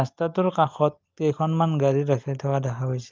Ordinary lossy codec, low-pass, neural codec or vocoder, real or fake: Opus, 32 kbps; 7.2 kHz; none; real